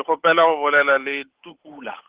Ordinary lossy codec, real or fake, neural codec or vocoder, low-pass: Opus, 16 kbps; fake; codec, 16 kHz, 8 kbps, FunCodec, trained on Chinese and English, 25 frames a second; 3.6 kHz